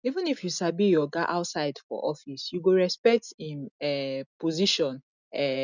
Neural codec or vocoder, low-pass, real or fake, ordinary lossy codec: none; 7.2 kHz; real; none